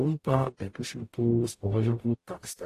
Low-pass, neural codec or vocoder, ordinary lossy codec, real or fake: 14.4 kHz; codec, 44.1 kHz, 0.9 kbps, DAC; AAC, 48 kbps; fake